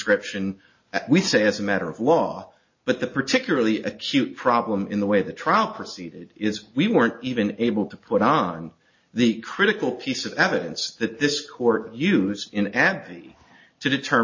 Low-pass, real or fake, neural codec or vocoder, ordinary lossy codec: 7.2 kHz; real; none; MP3, 32 kbps